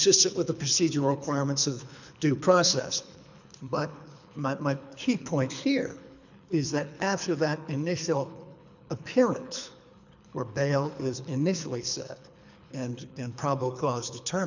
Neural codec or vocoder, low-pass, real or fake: codec, 24 kHz, 3 kbps, HILCodec; 7.2 kHz; fake